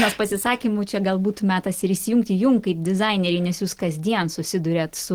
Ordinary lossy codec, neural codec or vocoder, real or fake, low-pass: Opus, 16 kbps; none; real; 19.8 kHz